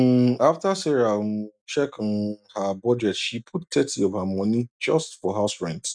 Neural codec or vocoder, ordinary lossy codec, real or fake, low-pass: none; none; real; 9.9 kHz